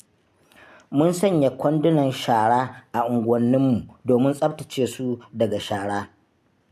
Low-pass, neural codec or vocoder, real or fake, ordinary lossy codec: 14.4 kHz; none; real; MP3, 96 kbps